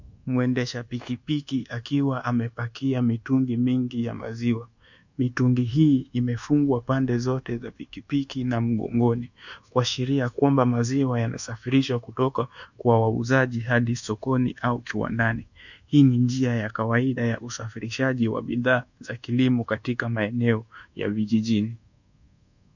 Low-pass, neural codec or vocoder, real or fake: 7.2 kHz; codec, 24 kHz, 1.2 kbps, DualCodec; fake